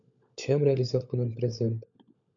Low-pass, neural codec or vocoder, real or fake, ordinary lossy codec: 7.2 kHz; codec, 16 kHz, 16 kbps, FunCodec, trained on LibriTTS, 50 frames a second; fake; MP3, 64 kbps